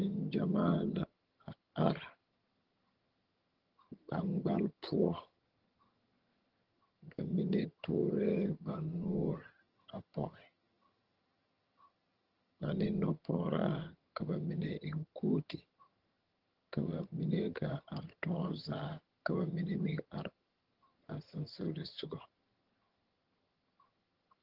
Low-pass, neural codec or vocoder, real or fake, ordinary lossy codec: 5.4 kHz; vocoder, 22.05 kHz, 80 mel bands, HiFi-GAN; fake; Opus, 16 kbps